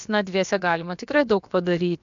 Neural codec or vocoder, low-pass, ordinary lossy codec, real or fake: codec, 16 kHz, about 1 kbps, DyCAST, with the encoder's durations; 7.2 kHz; MP3, 64 kbps; fake